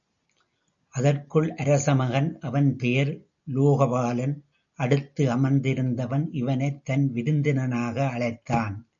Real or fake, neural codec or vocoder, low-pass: real; none; 7.2 kHz